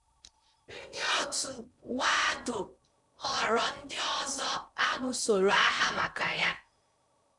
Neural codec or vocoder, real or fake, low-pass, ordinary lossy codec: codec, 16 kHz in and 24 kHz out, 0.6 kbps, FocalCodec, streaming, 4096 codes; fake; 10.8 kHz; none